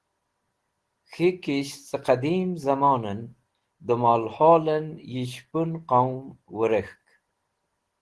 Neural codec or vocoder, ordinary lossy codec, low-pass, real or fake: none; Opus, 16 kbps; 10.8 kHz; real